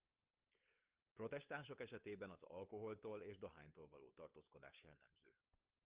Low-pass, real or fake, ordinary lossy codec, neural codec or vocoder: 3.6 kHz; fake; Opus, 32 kbps; codec, 16 kHz, 8 kbps, FunCodec, trained on LibriTTS, 25 frames a second